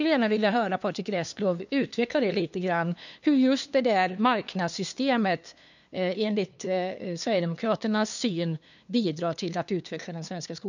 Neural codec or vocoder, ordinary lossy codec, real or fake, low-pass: codec, 16 kHz, 2 kbps, FunCodec, trained on LibriTTS, 25 frames a second; none; fake; 7.2 kHz